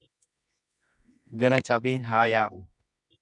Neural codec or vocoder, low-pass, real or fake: codec, 24 kHz, 0.9 kbps, WavTokenizer, medium music audio release; 10.8 kHz; fake